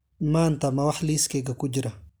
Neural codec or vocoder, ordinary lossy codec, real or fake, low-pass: none; none; real; none